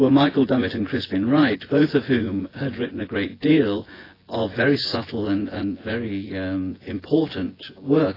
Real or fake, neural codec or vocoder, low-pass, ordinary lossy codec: fake; vocoder, 24 kHz, 100 mel bands, Vocos; 5.4 kHz; AAC, 24 kbps